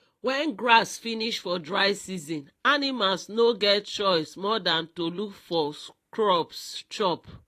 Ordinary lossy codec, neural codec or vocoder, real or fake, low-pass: AAC, 48 kbps; vocoder, 44.1 kHz, 128 mel bands every 512 samples, BigVGAN v2; fake; 14.4 kHz